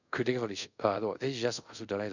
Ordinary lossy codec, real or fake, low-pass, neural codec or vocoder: none; fake; 7.2 kHz; codec, 24 kHz, 0.5 kbps, DualCodec